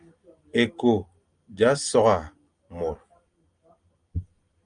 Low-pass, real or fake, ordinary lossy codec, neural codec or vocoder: 9.9 kHz; real; Opus, 32 kbps; none